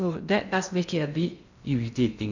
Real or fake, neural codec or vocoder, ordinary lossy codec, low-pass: fake; codec, 16 kHz in and 24 kHz out, 0.6 kbps, FocalCodec, streaming, 2048 codes; none; 7.2 kHz